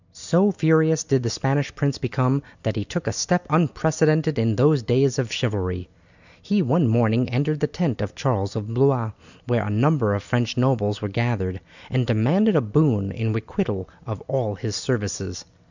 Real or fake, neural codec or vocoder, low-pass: real; none; 7.2 kHz